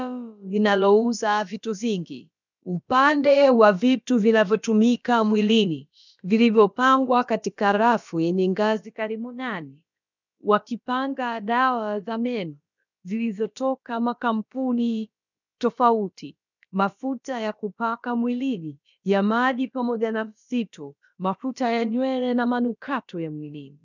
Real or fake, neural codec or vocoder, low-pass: fake; codec, 16 kHz, about 1 kbps, DyCAST, with the encoder's durations; 7.2 kHz